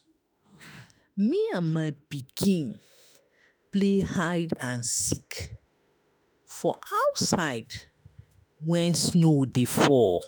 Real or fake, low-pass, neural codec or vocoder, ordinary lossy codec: fake; none; autoencoder, 48 kHz, 32 numbers a frame, DAC-VAE, trained on Japanese speech; none